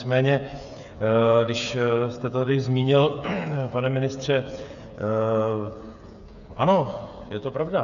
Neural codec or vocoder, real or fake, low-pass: codec, 16 kHz, 8 kbps, FreqCodec, smaller model; fake; 7.2 kHz